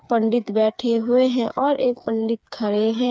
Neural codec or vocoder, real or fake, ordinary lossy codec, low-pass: codec, 16 kHz, 4 kbps, FreqCodec, smaller model; fake; none; none